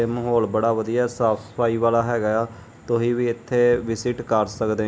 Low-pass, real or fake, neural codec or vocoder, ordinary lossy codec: none; real; none; none